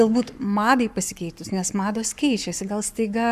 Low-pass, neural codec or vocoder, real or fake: 14.4 kHz; codec, 44.1 kHz, 7.8 kbps, Pupu-Codec; fake